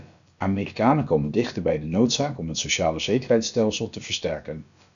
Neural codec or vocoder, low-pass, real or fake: codec, 16 kHz, about 1 kbps, DyCAST, with the encoder's durations; 7.2 kHz; fake